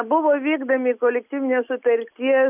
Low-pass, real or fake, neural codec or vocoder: 3.6 kHz; real; none